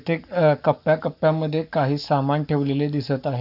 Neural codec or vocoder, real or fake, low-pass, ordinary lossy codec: codec, 44.1 kHz, 7.8 kbps, Pupu-Codec; fake; 5.4 kHz; none